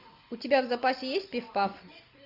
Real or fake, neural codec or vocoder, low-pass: real; none; 5.4 kHz